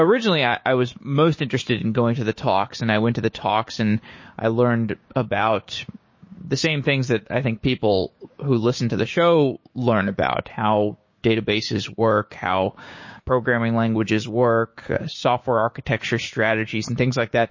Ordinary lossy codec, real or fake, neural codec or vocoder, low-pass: MP3, 32 kbps; fake; codec, 16 kHz, 6 kbps, DAC; 7.2 kHz